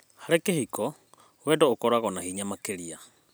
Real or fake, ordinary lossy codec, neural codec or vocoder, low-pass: real; none; none; none